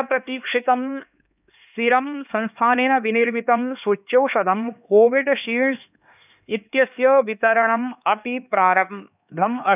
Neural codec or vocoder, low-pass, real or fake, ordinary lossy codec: codec, 16 kHz, 2 kbps, X-Codec, HuBERT features, trained on LibriSpeech; 3.6 kHz; fake; none